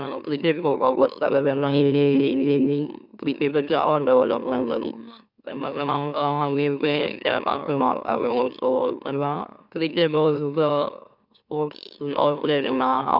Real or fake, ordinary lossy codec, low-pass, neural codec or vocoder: fake; none; 5.4 kHz; autoencoder, 44.1 kHz, a latent of 192 numbers a frame, MeloTTS